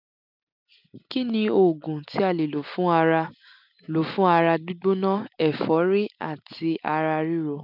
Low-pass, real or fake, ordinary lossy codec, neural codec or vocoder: 5.4 kHz; real; none; none